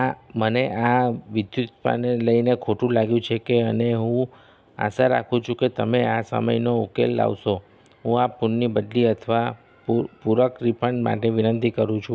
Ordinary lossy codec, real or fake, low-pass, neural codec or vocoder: none; real; none; none